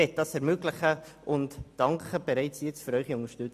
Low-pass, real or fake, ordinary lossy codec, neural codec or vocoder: 14.4 kHz; real; AAC, 96 kbps; none